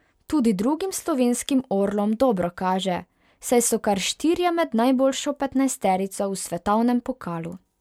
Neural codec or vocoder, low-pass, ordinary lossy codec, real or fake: none; 19.8 kHz; MP3, 96 kbps; real